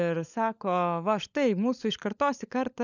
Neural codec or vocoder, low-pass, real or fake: codec, 16 kHz, 16 kbps, FunCodec, trained on LibriTTS, 50 frames a second; 7.2 kHz; fake